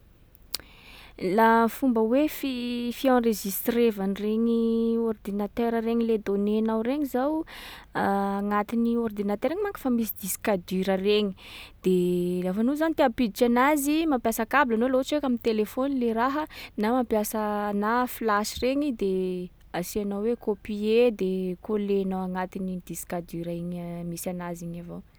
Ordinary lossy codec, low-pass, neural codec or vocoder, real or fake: none; none; none; real